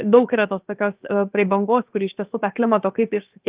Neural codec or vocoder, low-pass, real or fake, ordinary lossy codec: codec, 16 kHz, 0.7 kbps, FocalCodec; 3.6 kHz; fake; Opus, 32 kbps